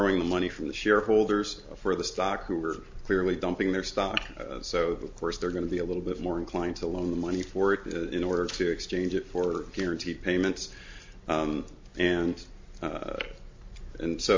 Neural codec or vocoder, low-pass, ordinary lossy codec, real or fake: none; 7.2 kHz; MP3, 48 kbps; real